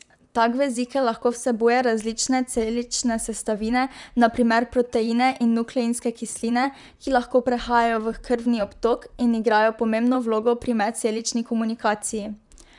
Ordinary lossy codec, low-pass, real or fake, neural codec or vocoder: none; 10.8 kHz; fake; vocoder, 44.1 kHz, 128 mel bands, Pupu-Vocoder